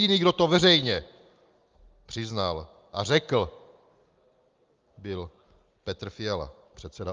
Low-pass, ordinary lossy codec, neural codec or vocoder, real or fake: 7.2 kHz; Opus, 32 kbps; none; real